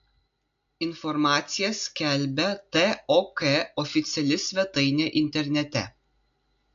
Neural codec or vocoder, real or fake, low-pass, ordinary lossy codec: none; real; 7.2 kHz; AAC, 64 kbps